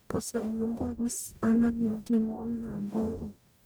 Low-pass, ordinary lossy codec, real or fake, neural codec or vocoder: none; none; fake; codec, 44.1 kHz, 0.9 kbps, DAC